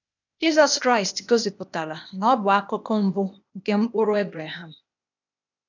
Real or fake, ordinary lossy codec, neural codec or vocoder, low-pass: fake; none; codec, 16 kHz, 0.8 kbps, ZipCodec; 7.2 kHz